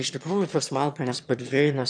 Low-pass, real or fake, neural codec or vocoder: 9.9 kHz; fake; autoencoder, 22.05 kHz, a latent of 192 numbers a frame, VITS, trained on one speaker